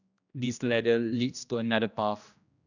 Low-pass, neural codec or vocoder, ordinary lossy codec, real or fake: 7.2 kHz; codec, 16 kHz, 1 kbps, X-Codec, HuBERT features, trained on general audio; none; fake